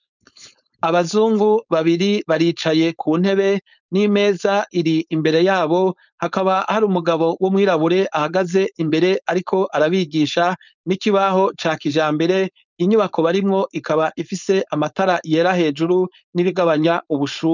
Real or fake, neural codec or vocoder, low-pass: fake; codec, 16 kHz, 4.8 kbps, FACodec; 7.2 kHz